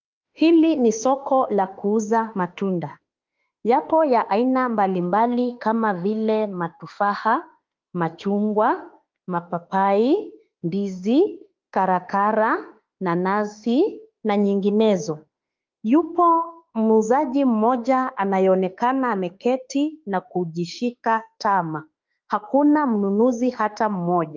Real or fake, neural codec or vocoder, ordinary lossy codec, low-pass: fake; autoencoder, 48 kHz, 32 numbers a frame, DAC-VAE, trained on Japanese speech; Opus, 24 kbps; 7.2 kHz